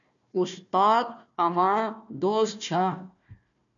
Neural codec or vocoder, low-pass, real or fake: codec, 16 kHz, 1 kbps, FunCodec, trained on Chinese and English, 50 frames a second; 7.2 kHz; fake